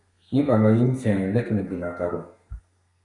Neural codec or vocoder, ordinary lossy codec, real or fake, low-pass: codec, 32 kHz, 1.9 kbps, SNAC; MP3, 64 kbps; fake; 10.8 kHz